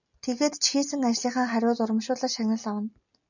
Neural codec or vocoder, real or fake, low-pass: none; real; 7.2 kHz